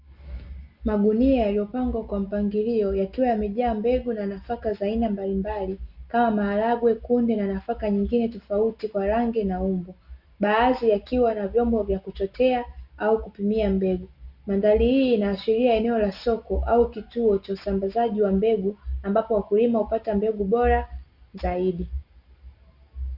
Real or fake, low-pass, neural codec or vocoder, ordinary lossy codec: real; 5.4 kHz; none; Opus, 64 kbps